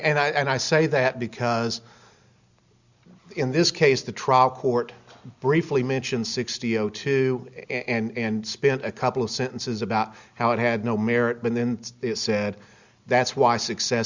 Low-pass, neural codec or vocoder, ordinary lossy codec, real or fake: 7.2 kHz; none; Opus, 64 kbps; real